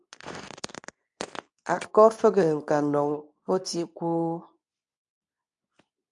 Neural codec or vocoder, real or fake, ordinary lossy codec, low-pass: codec, 24 kHz, 0.9 kbps, WavTokenizer, medium speech release version 2; fake; AAC, 64 kbps; 10.8 kHz